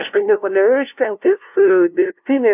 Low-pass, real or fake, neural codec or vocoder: 3.6 kHz; fake; codec, 16 kHz, 0.5 kbps, FunCodec, trained on LibriTTS, 25 frames a second